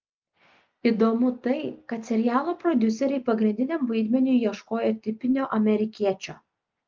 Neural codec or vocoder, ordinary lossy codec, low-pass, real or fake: none; Opus, 24 kbps; 7.2 kHz; real